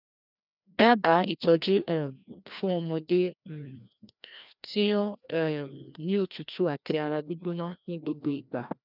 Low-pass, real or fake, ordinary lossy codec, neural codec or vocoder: 5.4 kHz; fake; none; codec, 16 kHz, 1 kbps, FreqCodec, larger model